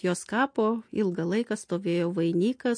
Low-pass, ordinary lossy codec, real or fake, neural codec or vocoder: 9.9 kHz; MP3, 48 kbps; real; none